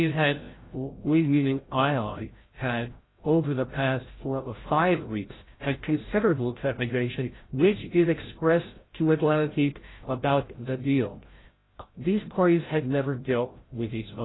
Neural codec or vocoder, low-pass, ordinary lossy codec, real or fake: codec, 16 kHz, 0.5 kbps, FreqCodec, larger model; 7.2 kHz; AAC, 16 kbps; fake